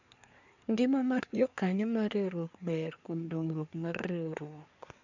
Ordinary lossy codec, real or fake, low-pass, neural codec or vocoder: none; fake; 7.2 kHz; codec, 24 kHz, 1 kbps, SNAC